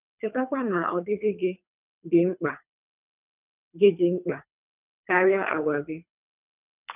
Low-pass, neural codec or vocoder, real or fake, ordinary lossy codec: 3.6 kHz; codec, 24 kHz, 3 kbps, HILCodec; fake; none